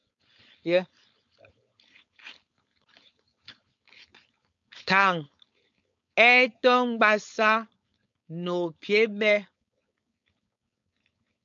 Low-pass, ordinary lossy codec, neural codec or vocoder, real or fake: 7.2 kHz; MP3, 64 kbps; codec, 16 kHz, 4.8 kbps, FACodec; fake